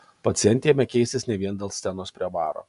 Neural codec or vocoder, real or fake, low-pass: none; real; 10.8 kHz